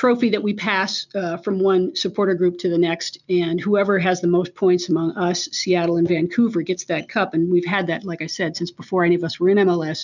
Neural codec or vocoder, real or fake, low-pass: none; real; 7.2 kHz